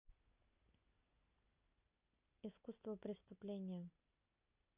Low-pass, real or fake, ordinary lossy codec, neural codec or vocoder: 3.6 kHz; real; Opus, 64 kbps; none